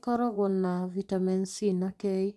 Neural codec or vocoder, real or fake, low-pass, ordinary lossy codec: vocoder, 24 kHz, 100 mel bands, Vocos; fake; none; none